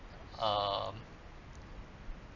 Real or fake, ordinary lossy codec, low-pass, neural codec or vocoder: real; none; 7.2 kHz; none